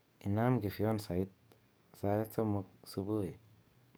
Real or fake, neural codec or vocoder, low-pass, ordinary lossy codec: fake; codec, 44.1 kHz, 7.8 kbps, DAC; none; none